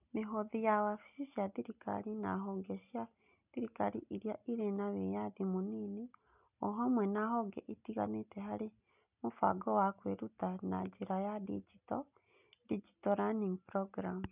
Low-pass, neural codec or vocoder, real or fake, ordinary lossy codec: 3.6 kHz; none; real; none